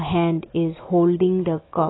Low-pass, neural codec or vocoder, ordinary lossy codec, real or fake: 7.2 kHz; none; AAC, 16 kbps; real